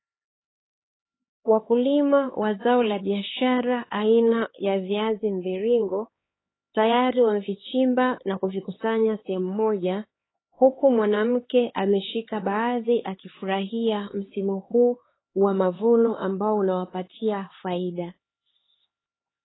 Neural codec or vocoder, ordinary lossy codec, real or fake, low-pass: codec, 16 kHz, 4 kbps, X-Codec, HuBERT features, trained on LibriSpeech; AAC, 16 kbps; fake; 7.2 kHz